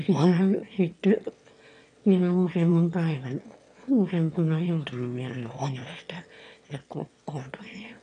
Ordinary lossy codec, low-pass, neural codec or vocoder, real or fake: none; 9.9 kHz; autoencoder, 22.05 kHz, a latent of 192 numbers a frame, VITS, trained on one speaker; fake